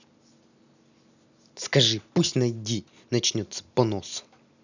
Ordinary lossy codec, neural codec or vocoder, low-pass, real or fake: none; none; 7.2 kHz; real